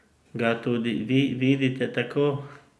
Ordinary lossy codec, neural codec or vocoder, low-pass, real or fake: none; none; none; real